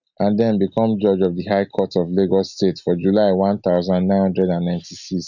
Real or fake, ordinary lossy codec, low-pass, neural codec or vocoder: real; none; 7.2 kHz; none